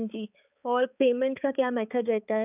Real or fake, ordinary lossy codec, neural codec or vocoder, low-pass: fake; none; codec, 16 kHz, 4 kbps, X-Codec, HuBERT features, trained on balanced general audio; 3.6 kHz